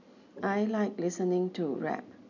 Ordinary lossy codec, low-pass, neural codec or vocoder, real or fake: none; 7.2 kHz; none; real